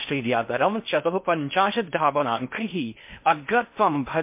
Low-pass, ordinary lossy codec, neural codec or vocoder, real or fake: 3.6 kHz; MP3, 32 kbps; codec, 16 kHz in and 24 kHz out, 0.6 kbps, FocalCodec, streaming, 4096 codes; fake